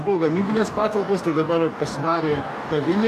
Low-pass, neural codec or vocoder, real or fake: 14.4 kHz; codec, 44.1 kHz, 2.6 kbps, DAC; fake